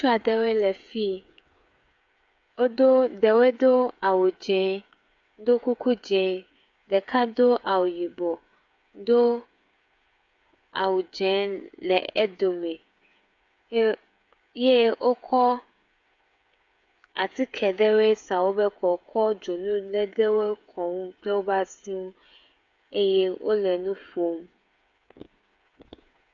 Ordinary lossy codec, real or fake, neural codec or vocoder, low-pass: AAC, 64 kbps; fake; codec, 16 kHz, 8 kbps, FreqCodec, smaller model; 7.2 kHz